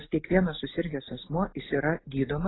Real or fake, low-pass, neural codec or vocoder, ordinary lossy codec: real; 7.2 kHz; none; AAC, 16 kbps